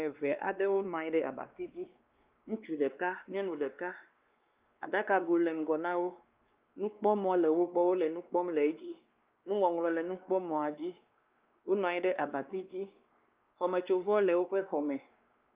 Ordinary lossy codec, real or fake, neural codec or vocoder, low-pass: Opus, 24 kbps; fake; codec, 16 kHz, 2 kbps, X-Codec, WavLM features, trained on Multilingual LibriSpeech; 3.6 kHz